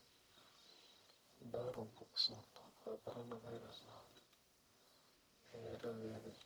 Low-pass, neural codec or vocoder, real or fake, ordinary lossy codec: none; codec, 44.1 kHz, 1.7 kbps, Pupu-Codec; fake; none